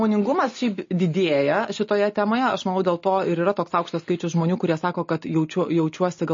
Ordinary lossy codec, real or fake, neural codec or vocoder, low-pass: MP3, 32 kbps; real; none; 7.2 kHz